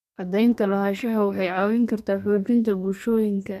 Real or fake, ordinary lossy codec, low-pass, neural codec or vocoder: fake; none; 14.4 kHz; codec, 32 kHz, 1.9 kbps, SNAC